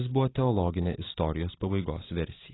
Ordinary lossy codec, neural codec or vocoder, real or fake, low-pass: AAC, 16 kbps; vocoder, 44.1 kHz, 128 mel bands every 512 samples, BigVGAN v2; fake; 7.2 kHz